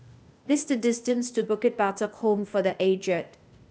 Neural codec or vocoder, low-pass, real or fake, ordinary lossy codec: codec, 16 kHz, 0.8 kbps, ZipCodec; none; fake; none